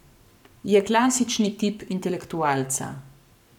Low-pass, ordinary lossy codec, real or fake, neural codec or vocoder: 19.8 kHz; none; fake; codec, 44.1 kHz, 7.8 kbps, Pupu-Codec